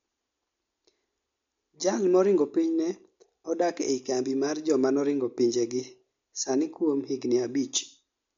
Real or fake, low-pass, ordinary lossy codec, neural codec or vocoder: real; 7.2 kHz; MP3, 48 kbps; none